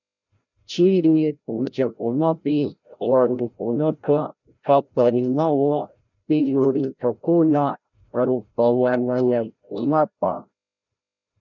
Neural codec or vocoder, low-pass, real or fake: codec, 16 kHz, 0.5 kbps, FreqCodec, larger model; 7.2 kHz; fake